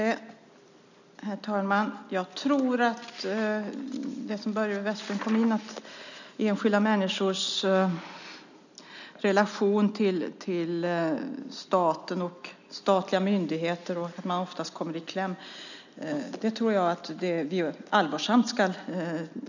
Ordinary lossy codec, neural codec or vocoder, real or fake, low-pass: none; none; real; 7.2 kHz